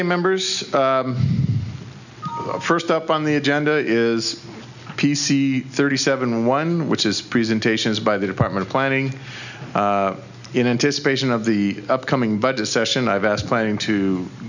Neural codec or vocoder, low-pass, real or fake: none; 7.2 kHz; real